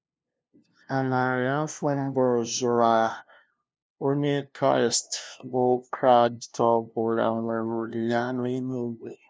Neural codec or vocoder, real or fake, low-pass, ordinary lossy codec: codec, 16 kHz, 0.5 kbps, FunCodec, trained on LibriTTS, 25 frames a second; fake; none; none